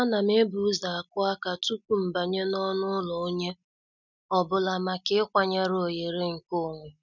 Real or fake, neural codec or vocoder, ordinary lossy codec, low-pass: real; none; none; 7.2 kHz